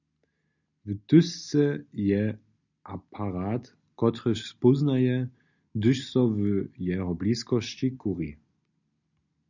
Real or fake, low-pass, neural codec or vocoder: real; 7.2 kHz; none